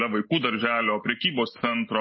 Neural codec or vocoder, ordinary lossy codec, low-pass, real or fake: none; MP3, 24 kbps; 7.2 kHz; real